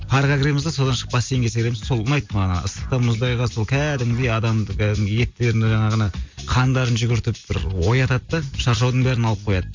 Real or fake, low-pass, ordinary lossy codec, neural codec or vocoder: real; 7.2 kHz; MP3, 48 kbps; none